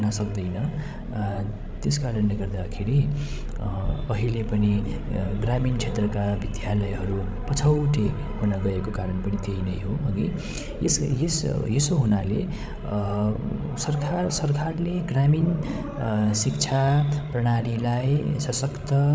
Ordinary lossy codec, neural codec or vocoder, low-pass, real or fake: none; codec, 16 kHz, 16 kbps, FreqCodec, larger model; none; fake